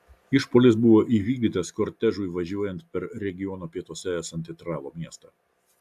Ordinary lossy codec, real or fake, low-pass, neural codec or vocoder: AAC, 96 kbps; real; 14.4 kHz; none